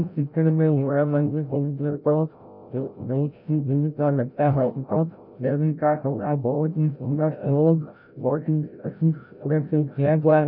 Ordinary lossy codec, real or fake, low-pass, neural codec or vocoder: none; fake; 5.4 kHz; codec, 16 kHz, 0.5 kbps, FreqCodec, larger model